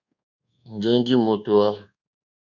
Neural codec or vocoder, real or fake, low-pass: codec, 24 kHz, 1.2 kbps, DualCodec; fake; 7.2 kHz